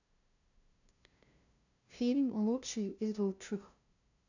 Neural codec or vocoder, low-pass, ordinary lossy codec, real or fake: codec, 16 kHz, 0.5 kbps, FunCodec, trained on LibriTTS, 25 frames a second; 7.2 kHz; none; fake